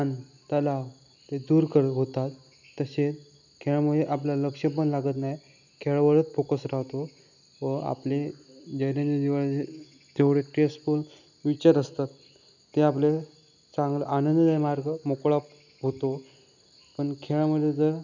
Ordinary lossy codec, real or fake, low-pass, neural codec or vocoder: none; real; 7.2 kHz; none